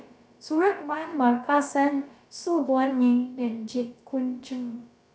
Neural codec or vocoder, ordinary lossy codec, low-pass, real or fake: codec, 16 kHz, about 1 kbps, DyCAST, with the encoder's durations; none; none; fake